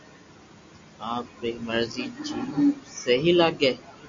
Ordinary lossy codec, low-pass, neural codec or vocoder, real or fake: MP3, 48 kbps; 7.2 kHz; none; real